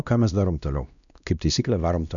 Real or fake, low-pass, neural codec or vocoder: fake; 7.2 kHz; codec, 16 kHz, 1 kbps, X-Codec, WavLM features, trained on Multilingual LibriSpeech